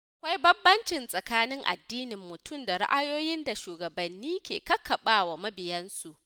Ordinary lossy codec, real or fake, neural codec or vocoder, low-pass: none; real; none; none